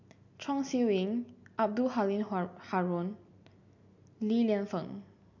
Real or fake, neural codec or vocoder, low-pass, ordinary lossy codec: real; none; 7.2 kHz; MP3, 64 kbps